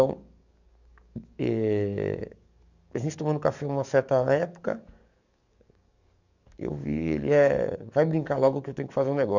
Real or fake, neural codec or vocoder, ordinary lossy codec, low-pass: fake; codec, 44.1 kHz, 7.8 kbps, DAC; none; 7.2 kHz